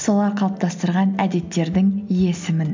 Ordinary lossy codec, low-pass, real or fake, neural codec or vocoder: MP3, 64 kbps; 7.2 kHz; real; none